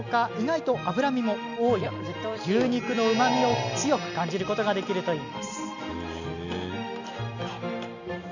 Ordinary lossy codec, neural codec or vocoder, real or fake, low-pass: none; none; real; 7.2 kHz